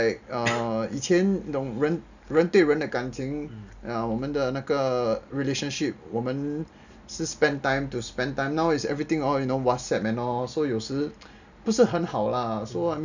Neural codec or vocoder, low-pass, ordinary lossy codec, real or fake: none; 7.2 kHz; none; real